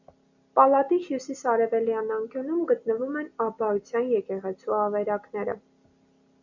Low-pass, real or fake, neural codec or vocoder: 7.2 kHz; real; none